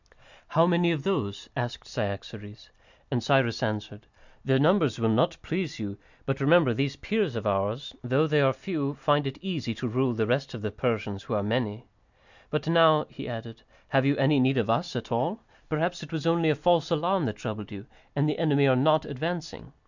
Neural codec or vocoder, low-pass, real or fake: none; 7.2 kHz; real